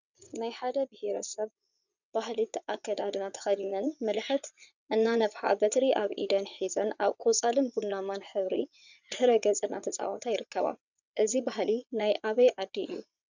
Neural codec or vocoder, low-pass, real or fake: vocoder, 22.05 kHz, 80 mel bands, WaveNeXt; 7.2 kHz; fake